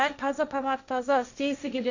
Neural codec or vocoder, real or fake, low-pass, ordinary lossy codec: codec, 16 kHz, 1.1 kbps, Voila-Tokenizer; fake; 7.2 kHz; none